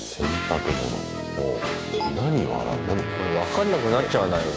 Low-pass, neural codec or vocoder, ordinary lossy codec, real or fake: none; codec, 16 kHz, 6 kbps, DAC; none; fake